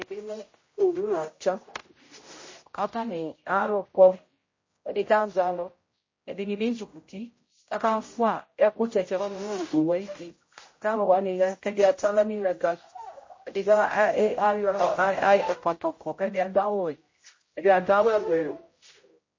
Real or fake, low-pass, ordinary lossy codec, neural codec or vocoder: fake; 7.2 kHz; MP3, 32 kbps; codec, 16 kHz, 0.5 kbps, X-Codec, HuBERT features, trained on general audio